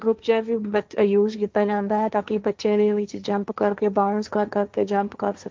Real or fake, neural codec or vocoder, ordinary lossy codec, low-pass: fake; codec, 16 kHz, 1.1 kbps, Voila-Tokenizer; Opus, 24 kbps; 7.2 kHz